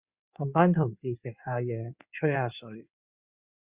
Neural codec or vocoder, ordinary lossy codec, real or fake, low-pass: codec, 16 kHz in and 24 kHz out, 2.2 kbps, FireRedTTS-2 codec; Opus, 64 kbps; fake; 3.6 kHz